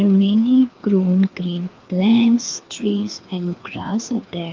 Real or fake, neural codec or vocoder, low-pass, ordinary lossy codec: fake; codec, 16 kHz, 2 kbps, FreqCodec, larger model; 7.2 kHz; Opus, 24 kbps